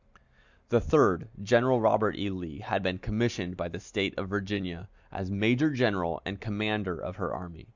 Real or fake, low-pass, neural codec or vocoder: real; 7.2 kHz; none